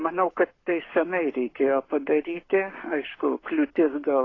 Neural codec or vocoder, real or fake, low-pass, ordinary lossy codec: codec, 44.1 kHz, 7.8 kbps, Pupu-Codec; fake; 7.2 kHz; AAC, 32 kbps